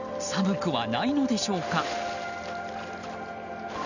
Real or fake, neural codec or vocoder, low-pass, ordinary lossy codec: real; none; 7.2 kHz; none